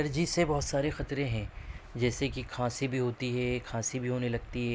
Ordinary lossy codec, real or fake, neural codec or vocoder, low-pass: none; real; none; none